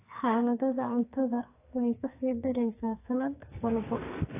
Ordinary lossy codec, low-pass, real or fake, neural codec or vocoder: AAC, 24 kbps; 3.6 kHz; fake; codec, 44.1 kHz, 2.6 kbps, SNAC